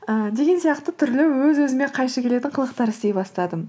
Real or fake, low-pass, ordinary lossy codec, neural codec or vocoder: real; none; none; none